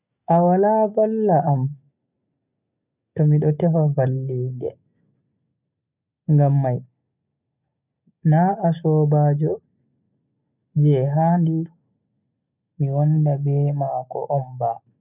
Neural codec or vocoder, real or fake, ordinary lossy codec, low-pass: none; real; none; 3.6 kHz